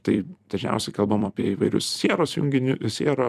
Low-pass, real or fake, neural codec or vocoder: 14.4 kHz; fake; vocoder, 44.1 kHz, 128 mel bands every 256 samples, BigVGAN v2